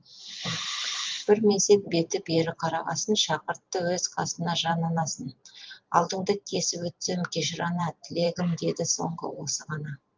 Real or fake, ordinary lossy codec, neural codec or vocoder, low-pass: fake; Opus, 32 kbps; vocoder, 44.1 kHz, 128 mel bands every 512 samples, BigVGAN v2; 7.2 kHz